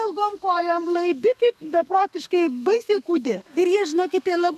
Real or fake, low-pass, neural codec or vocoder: fake; 14.4 kHz; codec, 32 kHz, 1.9 kbps, SNAC